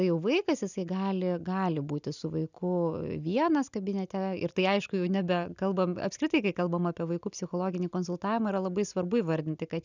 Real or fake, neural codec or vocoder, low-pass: real; none; 7.2 kHz